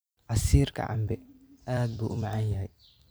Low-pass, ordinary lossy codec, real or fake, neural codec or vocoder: none; none; fake; vocoder, 44.1 kHz, 128 mel bands every 256 samples, BigVGAN v2